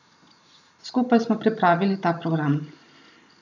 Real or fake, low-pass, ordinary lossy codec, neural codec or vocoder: real; 7.2 kHz; none; none